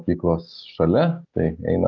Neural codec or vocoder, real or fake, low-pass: none; real; 7.2 kHz